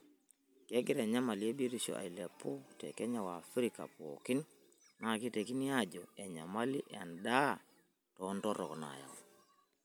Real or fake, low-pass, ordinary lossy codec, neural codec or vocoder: real; none; none; none